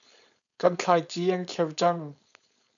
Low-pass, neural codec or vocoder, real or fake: 7.2 kHz; codec, 16 kHz, 4.8 kbps, FACodec; fake